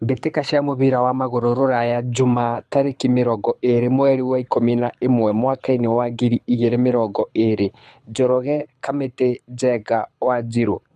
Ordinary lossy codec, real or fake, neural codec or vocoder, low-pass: none; fake; codec, 24 kHz, 6 kbps, HILCodec; none